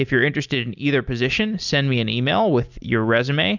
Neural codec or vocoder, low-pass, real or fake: none; 7.2 kHz; real